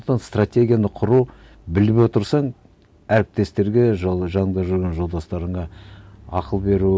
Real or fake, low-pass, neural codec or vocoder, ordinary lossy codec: real; none; none; none